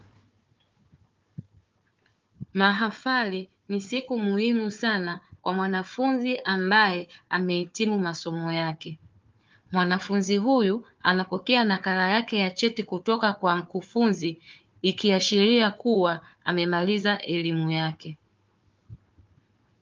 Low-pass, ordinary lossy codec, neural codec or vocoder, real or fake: 7.2 kHz; Opus, 32 kbps; codec, 16 kHz, 4 kbps, FunCodec, trained on Chinese and English, 50 frames a second; fake